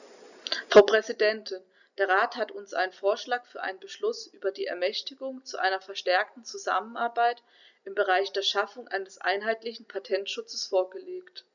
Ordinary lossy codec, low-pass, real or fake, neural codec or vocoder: none; 7.2 kHz; real; none